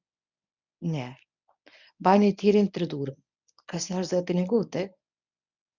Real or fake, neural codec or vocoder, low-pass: fake; codec, 24 kHz, 0.9 kbps, WavTokenizer, medium speech release version 1; 7.2 kHz